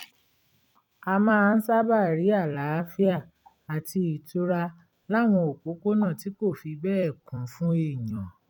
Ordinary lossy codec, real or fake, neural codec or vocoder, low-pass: none; fake; vocoder, 44.1 kHz, 128 mel bands every 256 samples, BigVGAN v2; 19.8 kHz